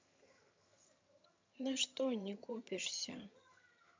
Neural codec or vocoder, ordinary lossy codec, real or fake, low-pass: vocoder, 22.05 kHz, 80 mel bands, HiFi-GAN; MP3, 64 kbps; fake; 7.2 kHz